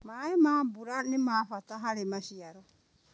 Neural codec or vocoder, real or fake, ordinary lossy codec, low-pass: none; real; none; none